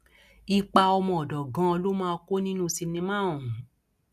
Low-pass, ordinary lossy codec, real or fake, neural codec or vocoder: 14.4 kHz; none; real; none